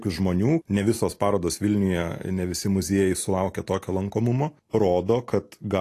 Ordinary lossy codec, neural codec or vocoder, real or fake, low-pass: AAC, 48 kbps; none; real; 14.4 kHz